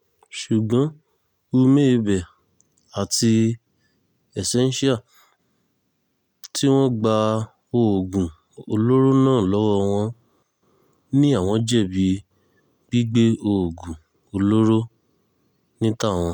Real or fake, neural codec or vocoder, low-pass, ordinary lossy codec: real; none; 19.8 kHz; none